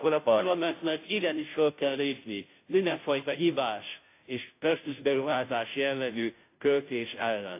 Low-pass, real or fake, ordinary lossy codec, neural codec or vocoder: 3.6 kHz; fake; AAC, 24 kbps; codec, 16 kHz, 0.5 kbps, FunCodec, trained on Chinese and English, 25 frames a second